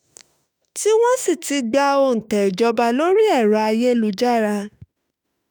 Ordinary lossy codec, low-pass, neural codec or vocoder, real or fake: none; none; autoencoder, 48 kHz, 32 numbers a frame, DAC-VAE, trained on Japanese speech; fake